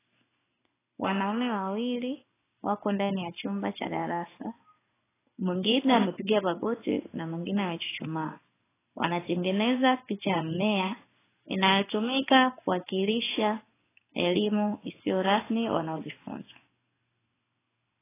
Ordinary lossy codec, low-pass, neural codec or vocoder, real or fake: AAC, 16 kbps; 3.6 kHz; codec, 16 kHz in and 24 kHz out, 1 kbps, XY-Tokenizer; fake